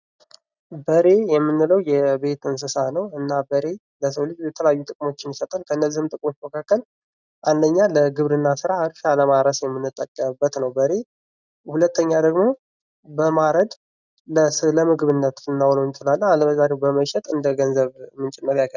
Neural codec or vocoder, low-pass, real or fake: none; 7.2 kHz; real